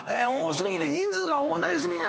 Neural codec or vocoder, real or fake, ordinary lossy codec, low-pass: codec, 16 kHz, 2 kbps, X-Codec, HuBERT features, trained on LibriSpeech; fake; none; none